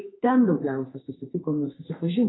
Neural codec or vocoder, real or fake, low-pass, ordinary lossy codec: codec, 32 kHz, 1.9 kbps, SNAC; fake; 7.2 kHz; AAC, 16 kbps